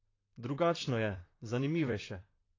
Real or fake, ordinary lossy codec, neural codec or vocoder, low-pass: fake; AAC, 32 kbps; vocoder, 44.1 kHz, 128 mel bands, Pupu-Vocoder; 7.2 kHz